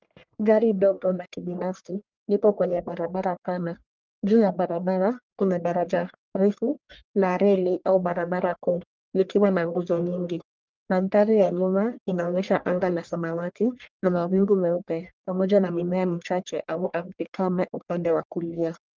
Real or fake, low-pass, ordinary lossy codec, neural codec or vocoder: fake; 7.2 kHz; Opus, 32 kbps; codec, 44.1 kHz, 1.7 kbps, Pupu-Codec